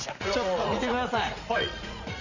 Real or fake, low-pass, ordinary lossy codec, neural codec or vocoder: fake; 7.2 kHz; none; vocoder, 44.1 kHz, 80 mel bands, Vocos